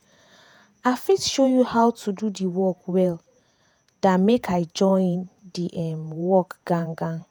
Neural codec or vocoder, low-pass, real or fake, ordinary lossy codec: vocoder, 48 kHz, 128 mel bands, Vocos; none; fake; none